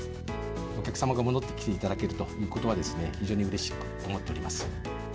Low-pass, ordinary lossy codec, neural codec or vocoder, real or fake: none; none; none; real